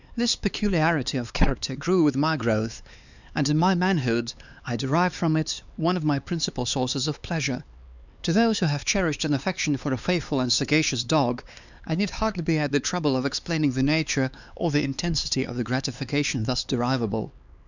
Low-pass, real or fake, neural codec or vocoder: 7.2 kHz; fake; codec, 16 kHz, 4 kbps, X-Codec, HuBERT features, trained on LibriSpeech